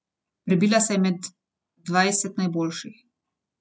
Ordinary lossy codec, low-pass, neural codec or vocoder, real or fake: none; none; none; real